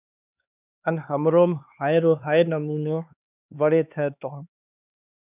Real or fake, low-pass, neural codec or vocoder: fake; 3.6 kHz; codec, 16 kHz, 2 kbps, X-Codec, HuBERT features, trained on LibriSpeech